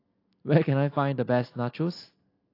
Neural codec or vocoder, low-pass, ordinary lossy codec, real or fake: none; 5.4 kHz; AAC, 32 kbps; real